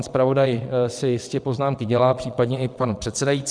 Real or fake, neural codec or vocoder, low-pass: fake; vocoder, 22.05 kHz, 80 mel bands, WaveNeXt; 9.9 kHz